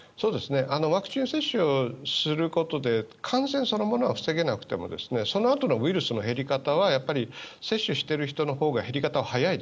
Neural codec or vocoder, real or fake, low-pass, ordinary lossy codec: none; real; none; none